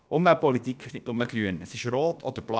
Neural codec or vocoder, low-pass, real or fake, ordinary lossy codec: codec, 16 kHz, about 1 kbps, DyCAST, with the encoder's durations; none; fake; none